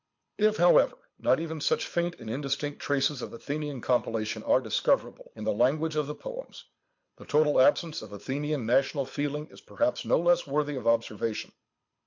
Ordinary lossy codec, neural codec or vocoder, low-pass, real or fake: MP3, 48 kbps; codec, 24 kHz, 6 kbps, HILCodec; 7.2 kHz; fake